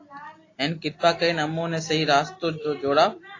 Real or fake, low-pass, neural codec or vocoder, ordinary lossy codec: real; 7.2 kHz; none; AAC, 32 kbps